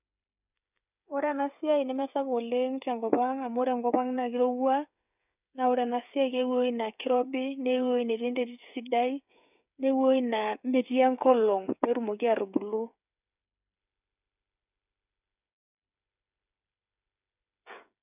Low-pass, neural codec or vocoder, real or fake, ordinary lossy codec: 3.6 kHz; codec, 16 kHz, 8 kbps, FreqCodec, smaller model; fake; none